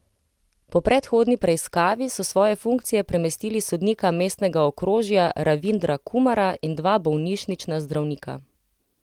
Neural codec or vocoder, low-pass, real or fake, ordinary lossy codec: none; 19.8 kHz; real; Opus, 24 kbps